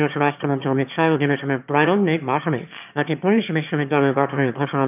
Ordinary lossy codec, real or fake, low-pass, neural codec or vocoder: none; fake; 3.6 kHz; autoencoder, 22.05 kHz, a latent of 192 numbers a frame, VITS, trained on one speaker